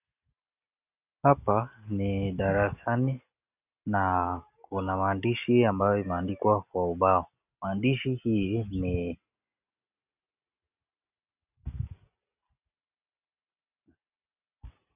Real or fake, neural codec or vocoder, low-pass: real; none; 3.6 kHz